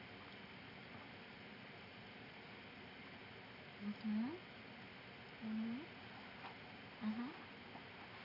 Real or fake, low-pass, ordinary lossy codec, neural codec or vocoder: real; 5.4 kHz; none; none